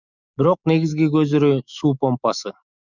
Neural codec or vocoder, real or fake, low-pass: autoencoder, 48 kHz, 128 numbers a frame, DAC-VAE, trained on Japanese speech; fake; 7.2 kHz